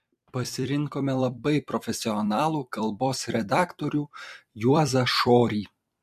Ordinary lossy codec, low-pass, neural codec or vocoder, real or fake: MP3, 64 kbps; 14.4 kHz; vocoder, 44.1 kHz, 128 mel bands every 256 samples, BigVGAN v2; fake